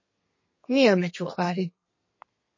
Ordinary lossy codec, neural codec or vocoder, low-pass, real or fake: MP3, 32 kbps; codec, 32 kHz, 1.9 kbps, SNAC; 7.2 kHz; fake